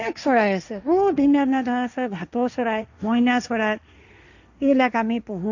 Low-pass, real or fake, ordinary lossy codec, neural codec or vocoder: 7.2 kHz; fake; none; codec, 16 kHz, 1.1 kbps, Voila-Tokenizer